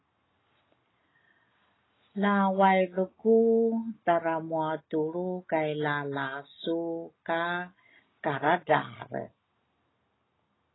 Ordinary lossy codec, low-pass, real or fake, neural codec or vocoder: AAC, 16 kbps; 7.2 kHz; real; none